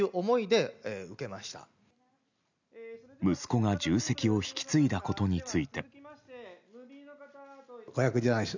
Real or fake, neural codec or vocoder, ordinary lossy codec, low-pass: real; none; none; 7.2 kHz